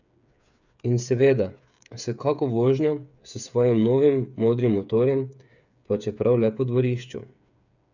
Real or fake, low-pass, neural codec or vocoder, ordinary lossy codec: fake; 7.2 kHz; codec, 16 kHz, 8 kbps, FreqCodec, smaller model; none